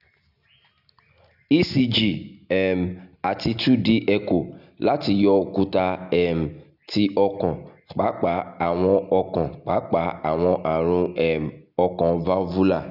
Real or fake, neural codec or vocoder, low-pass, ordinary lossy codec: real; none; 5.4 kHz; none